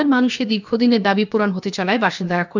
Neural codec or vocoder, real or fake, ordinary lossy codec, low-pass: codec, 16 kHz, about 1 kbps, DyCAST, with the encoder's durations; fake; none; 7.2 kHz